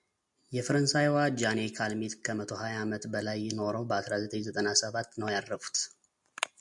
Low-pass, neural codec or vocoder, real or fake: 10.8 kHz; none; real